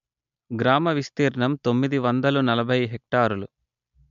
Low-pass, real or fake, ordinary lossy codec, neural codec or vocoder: 7.2 kHz; real; MP3, 64 kbps; none